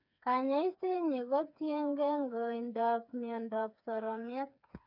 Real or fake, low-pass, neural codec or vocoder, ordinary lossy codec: fake; 5.4 kHz; codec, 16 kHz, 4 kbps, FreqCodec, smaller model; none